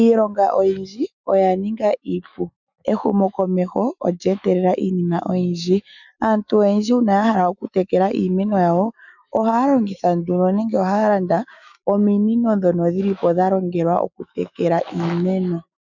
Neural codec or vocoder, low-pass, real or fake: autoencoder, 48 kHz, 128 numbers a frame, DAC-VAE, trained on Japanese speech; 7.2 kHz; fake